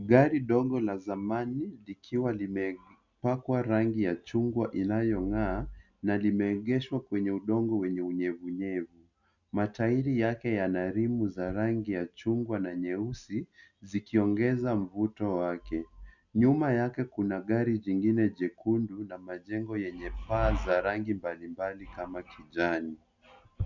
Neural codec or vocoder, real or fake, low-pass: none; real; 7.2 kHz